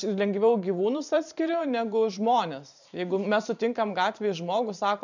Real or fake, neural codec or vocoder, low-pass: fake; vocoder, 44.1 kHz, 128 mel bands every 512 samples, BigVGAN v2; 7.2 kHz